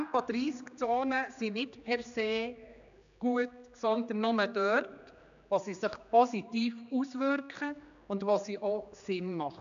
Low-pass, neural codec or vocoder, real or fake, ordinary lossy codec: 7.2 kHz; codec, 16 kHz, 2 kbps, X-Codec, HuBERT features, trained on general audio; fake; MP3, 96 kbps